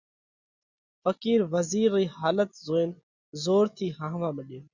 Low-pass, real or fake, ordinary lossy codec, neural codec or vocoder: 7.2 kHz; real; Opus, 64 kbps; none